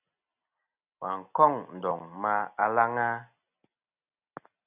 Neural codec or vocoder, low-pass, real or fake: none; 3.6 kHz; real